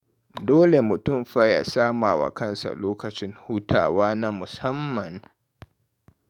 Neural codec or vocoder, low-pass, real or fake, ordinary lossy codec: codec, 44.1 kHz, 7.8 kbps, DAC; 19.8 kHz; fake; none